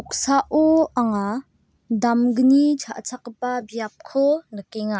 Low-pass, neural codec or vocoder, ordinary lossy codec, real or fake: none; none; none; real